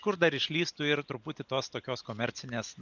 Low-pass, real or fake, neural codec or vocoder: 7.2 kHz; real; none